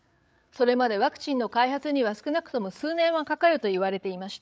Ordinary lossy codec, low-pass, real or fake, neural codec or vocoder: none; none; fake; codec, 16 kHz, 8 kbps, FreqCodec, larger model